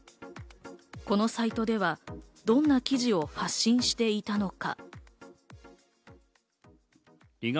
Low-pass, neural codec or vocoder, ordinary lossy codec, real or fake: none; none; none; real